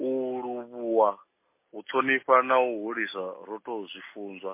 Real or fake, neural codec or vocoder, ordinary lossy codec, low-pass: real; none; MP3, 24 kbps; 3.6 kHz